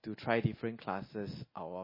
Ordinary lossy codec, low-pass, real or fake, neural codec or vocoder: MP3, 24 kbps; 5.4 kHz; real; none